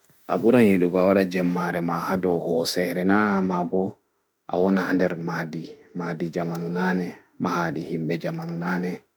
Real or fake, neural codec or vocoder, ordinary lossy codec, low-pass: fake; autoencoder, 48 kHz, 32 numbers a frame, DAC-VAE, trained on Japanese speech; none; none